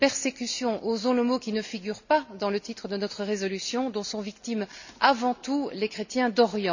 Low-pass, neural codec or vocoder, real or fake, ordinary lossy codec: 7.2 kHz; none; real; none